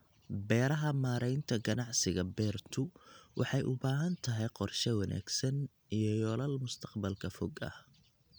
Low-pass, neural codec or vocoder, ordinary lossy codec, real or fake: none; none; none; real